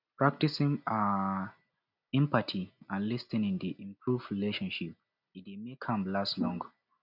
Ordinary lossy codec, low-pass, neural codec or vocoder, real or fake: none; 5.4 kHz; none; real